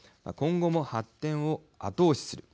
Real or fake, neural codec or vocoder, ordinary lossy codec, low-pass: real; none; none; none